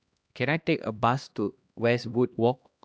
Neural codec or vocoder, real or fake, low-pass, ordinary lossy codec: codec, 16 kHz, 1 kbps, X-Codec, HuBERT features, trained on LibriSpeech; fake; none; none